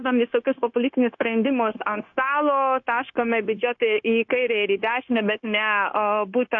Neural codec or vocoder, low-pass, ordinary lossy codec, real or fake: codec, 16 kHz, 0.9 kbps, LongCat-Audio-Codec; 7.2 kHz; AAC, 48 kbps; fake